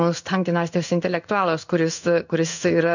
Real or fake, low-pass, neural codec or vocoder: fake; 7.2 kHz; codec, 16 kHz in and 24 kHz out, 1 kbps, XY-Tokenizer